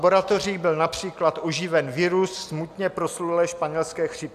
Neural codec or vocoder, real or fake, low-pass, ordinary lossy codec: none; real; 14.4 kHz; AAC, 96 kbps